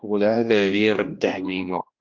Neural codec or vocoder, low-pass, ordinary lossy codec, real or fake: codec, 16 kHz, 1 kbps, X-Codec, HuBERT features, trained on general audio; 7.2 kHz; Opus, 32 kbps; fake